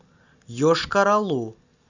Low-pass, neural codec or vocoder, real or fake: 7.2 kHz; none; real